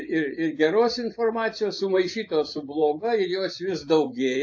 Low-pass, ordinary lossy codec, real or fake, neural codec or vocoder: 7.2 kHz; AAC, 48 kbps; fake; vocoder, 24 kHz, 100 mel bands, Vocos